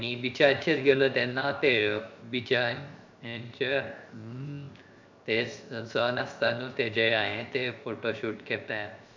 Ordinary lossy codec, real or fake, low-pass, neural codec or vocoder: MP3, 64 kbps; fake; 7.2 kHz; codec, 16 kHz, 0.7 kbps, FocalCodec